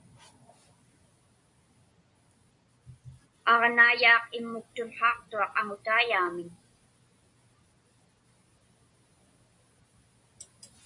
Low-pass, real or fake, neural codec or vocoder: 10.8 kHz; real; none